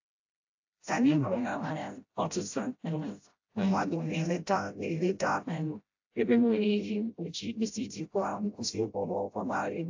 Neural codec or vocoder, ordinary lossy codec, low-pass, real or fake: codec, 16 kHz, 0.5 kbps, FreqCodec, smaller model; AAC, 48 kbps; 7.2 kHz; fake